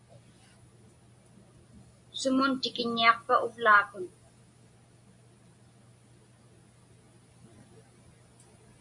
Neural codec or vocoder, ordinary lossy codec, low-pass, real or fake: none; AAC, 64 kbps; 10.8 kHz; real